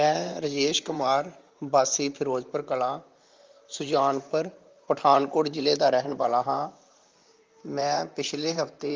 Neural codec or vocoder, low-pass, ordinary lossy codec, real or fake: vocoder, 44.1 kHz, 128 mel bands, Pupu-Vocoder; 7.2 kHz; Opus, 32 kbps; fake